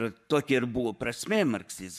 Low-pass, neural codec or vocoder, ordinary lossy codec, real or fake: 14.4 kHz; codec, 44.1 kHz, 7.8 kbps, Pupu-Codec; MP3, 96 kbps; fake